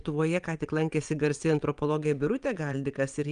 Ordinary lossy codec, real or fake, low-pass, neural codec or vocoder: Opus, 32 kbps; fake; 9.9 kHz; vocoder, 22.05 kHz, 80 mel bands, WaveNeXt